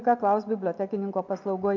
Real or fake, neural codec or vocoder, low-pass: real; none; 7.2 kHz